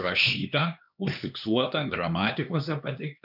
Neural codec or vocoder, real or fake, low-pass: codec, 16 kHz, 2 kbps, X-Codec, HuBERT features, trained on LibriSpeech; fake; 5.4 kHz